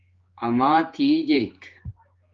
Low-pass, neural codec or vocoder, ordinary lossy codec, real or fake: 7.2 kHz; codec, 16 kHz, 4 kbps, X-Codec, HuBERT features, trained on general audio; Opus, 32 kbps; fake